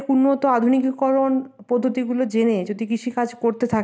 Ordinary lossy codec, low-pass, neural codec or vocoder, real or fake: none; none; none; real